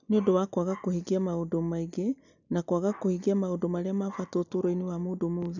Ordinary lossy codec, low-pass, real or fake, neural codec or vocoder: none; 7.2 kHz; real; none